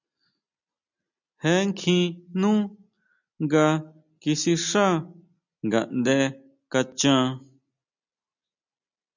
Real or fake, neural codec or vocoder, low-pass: real; none; 7.2 kHz